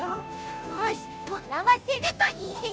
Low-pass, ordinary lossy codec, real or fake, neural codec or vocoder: none; none; fake; codec, 16 kHz, 0.5 kbps, FunCodec, trained on Chinese and English, 25 frames a second